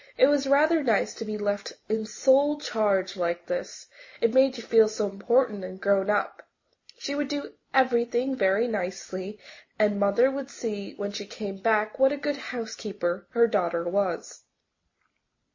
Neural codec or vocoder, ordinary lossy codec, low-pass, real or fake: none; MP3, 32 kbps; 7.2 kHz; real